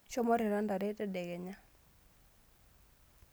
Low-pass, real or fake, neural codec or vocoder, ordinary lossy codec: none; real; none; none